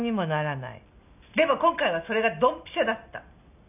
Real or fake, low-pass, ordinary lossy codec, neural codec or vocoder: real; 3.6 kHz; none; none